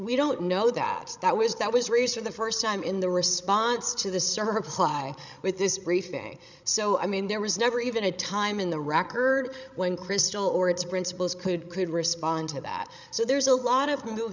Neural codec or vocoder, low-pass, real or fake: codec, 16 kHz, 16 kbps, FreqCodec, larger model; 7.2 kHz; fake